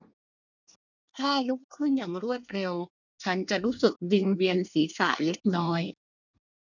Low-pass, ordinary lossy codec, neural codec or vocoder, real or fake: 7.2 kHz; none; codec, 24 kHz, 1 kbps, SNAC; fake